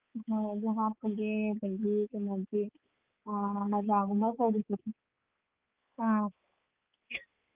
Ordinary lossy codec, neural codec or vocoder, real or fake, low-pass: Opus, 32 kbps; codec, 16 kHz, 4 kbps, X-Codec, HuBERT features, trained on balanced general audio; fake; 3.6 kHz